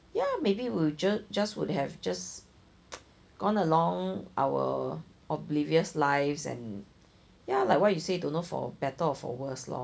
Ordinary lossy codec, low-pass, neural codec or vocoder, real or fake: none; none; none; real